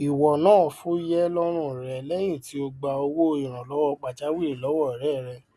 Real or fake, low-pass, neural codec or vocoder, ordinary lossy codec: real; none; none; none